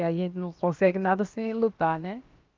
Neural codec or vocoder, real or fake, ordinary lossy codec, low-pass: codec, 16 kHz, about 1 kbps, DyCAST, with the encoder's durations; fake; Opus, 32 kbps; 7.2 kHz